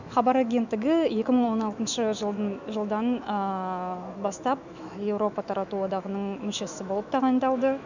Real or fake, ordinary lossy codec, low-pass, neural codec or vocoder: fake; none; 7.2 kHz; autoencoder, 48 kHz, 128 numbers a frame, DAC-VAE, trained on Japanese speech